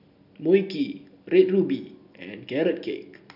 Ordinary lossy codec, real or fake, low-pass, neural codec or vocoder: none; real; 5.4 kHz; none